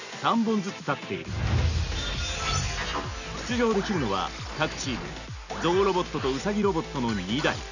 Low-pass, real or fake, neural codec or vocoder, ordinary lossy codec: 7.2 kHz; real; none; none